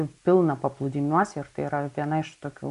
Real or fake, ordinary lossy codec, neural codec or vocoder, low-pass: real; AAC, 96 kbps; none; 10.8 kHz